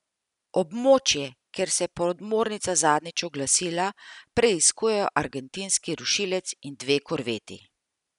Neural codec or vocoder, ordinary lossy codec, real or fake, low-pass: none; none; real; 10.8 kHz